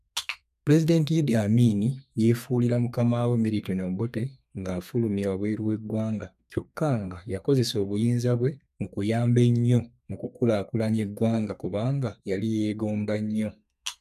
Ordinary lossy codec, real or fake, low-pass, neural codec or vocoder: none; fake; 14.4 kHz; codec, 32 kHz, 1.9 kbps, SNAC